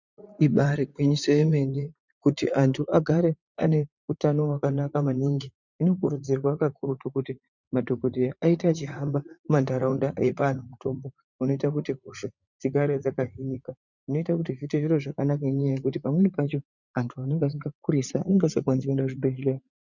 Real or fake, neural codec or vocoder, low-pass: fake; vocoder, 44.1 kHz, 80 mel bands, Vocos; 7.2 kHz